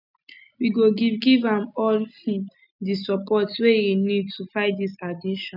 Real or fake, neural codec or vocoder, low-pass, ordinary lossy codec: real; none; 5.4 kHz; none